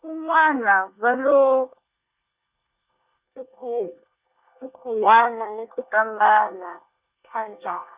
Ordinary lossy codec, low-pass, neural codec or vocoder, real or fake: Opus, 64 kbps; 3.6 kHz; codec, 24 kHz, 1 kbps, SNAC; fake